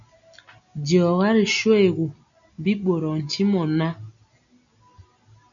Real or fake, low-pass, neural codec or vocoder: real; 7.2 kHz; none